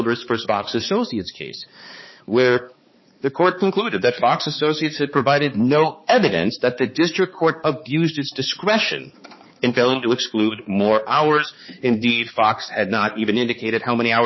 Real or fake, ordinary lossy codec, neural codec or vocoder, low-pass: fake; MP3, 24 kbps; codec, 16 kHz, 4 kbps, X-Codec, HuBERT features, trained on balanced general audio; 7.2 kHz